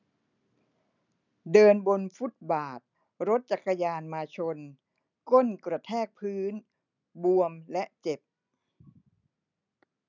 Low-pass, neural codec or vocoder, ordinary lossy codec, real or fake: 7.2 kHz; none; none; real